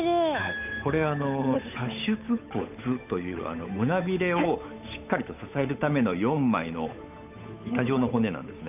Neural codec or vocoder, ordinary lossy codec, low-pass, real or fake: codec, 16 kHz, 8 kbps, FunCodec, trained on Chinese and English, 25 frames a second; none; 3.6 kHz; fake